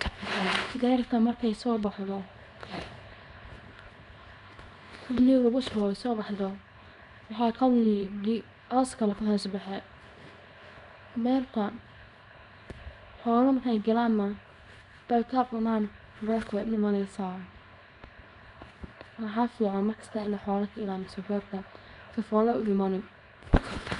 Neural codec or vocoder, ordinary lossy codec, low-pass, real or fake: codec, 24 kHz, 0.9 kbps, WavTokenizer, small release; none; 10.8 kHz; fake